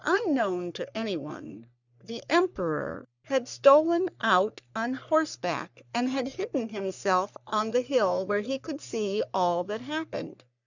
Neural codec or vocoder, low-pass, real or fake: codec, 44.1 kHz, 3.4 kbps, Pupu-Codec; 7.2 kHz; fake